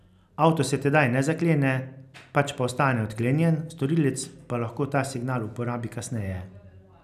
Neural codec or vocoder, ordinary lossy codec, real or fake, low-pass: none; none; real; 14.4 kHz